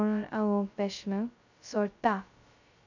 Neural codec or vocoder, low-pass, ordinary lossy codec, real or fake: codec, 16 kHz, 0.2 kbps, FocalCodec; 7.2 kHz; none; fake